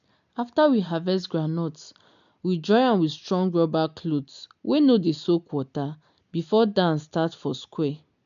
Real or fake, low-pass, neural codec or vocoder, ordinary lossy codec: real; 7.2 kHz; none; none